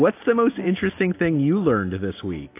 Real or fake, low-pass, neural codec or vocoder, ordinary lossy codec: real; 3.6 kHz; none; AAC, 24 kbps